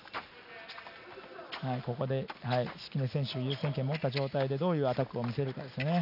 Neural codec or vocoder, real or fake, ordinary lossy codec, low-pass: none; real; none; 5.4 kHz